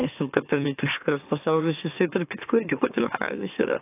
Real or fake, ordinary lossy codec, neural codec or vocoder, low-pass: fake; AAC, 24 kbps; autoencoder, 44.1 kHz, a latent of 192 numbers a frame, MeloTTS; 3.6 kHz